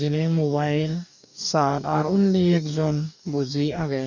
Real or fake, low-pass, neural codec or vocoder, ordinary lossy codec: fake; 7.2 kHz; codec, 44.1 kHz, 2.6 kbps, DAC; none